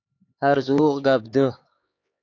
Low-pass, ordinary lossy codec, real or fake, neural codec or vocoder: 7.2 kHz; AAC, 48 kbps; fake; codec, 16 kHz, 4 kbps, X-Codec, HuBERT features, trained on LibriSpeech